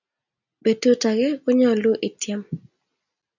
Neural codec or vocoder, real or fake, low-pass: none; real; 7.2 kHz